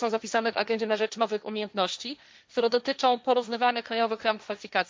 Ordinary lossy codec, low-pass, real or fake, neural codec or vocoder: none; none; fake; codec, 16 kHz, 1.1 kbps, Voila-Tokenizer